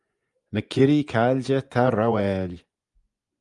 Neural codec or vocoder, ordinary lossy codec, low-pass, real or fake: vocoder, 24 kHz, 100 mel bands, Vocos; Opus, 32 kbps; 10.8 kHz; fake